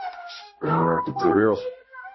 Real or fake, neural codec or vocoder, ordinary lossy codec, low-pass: fake; codec, 16 kHz, 0.5 kbps, X-Codec, HuBERT features, trained on balanced general audio; MP3, 24 kbps; 7.2 kHz